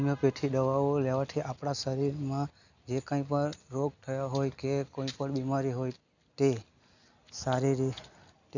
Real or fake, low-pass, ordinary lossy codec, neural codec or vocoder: real; 7.2 kHz; none; none